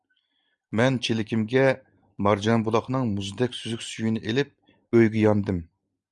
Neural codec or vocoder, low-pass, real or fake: none; 10.8 kHz; real